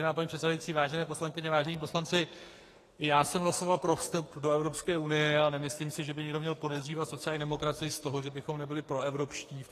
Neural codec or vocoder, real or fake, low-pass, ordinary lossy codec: codec, 44.1 kHz, 2.6 kbps, SNAC; fake; 14.4 kHz; AAC, 48 kbps